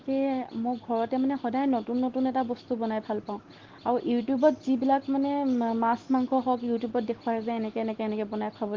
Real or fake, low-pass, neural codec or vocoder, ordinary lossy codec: real; 7.2 kHz; none; Opus, 16 kbps